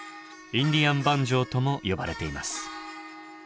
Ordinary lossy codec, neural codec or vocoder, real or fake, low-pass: none; none; real; none